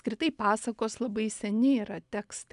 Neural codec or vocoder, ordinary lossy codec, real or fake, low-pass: none; AAC, 96 kbps; real; 10.8 kHz